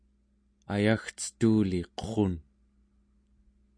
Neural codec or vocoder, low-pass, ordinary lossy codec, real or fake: none; 9.9 kHz; MP3, 64 kbps; real